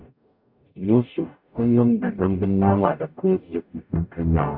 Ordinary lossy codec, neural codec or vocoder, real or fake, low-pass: none; codec, 44.1 kHz, 0.9 kbps, DAC; fake; 5.4 kHz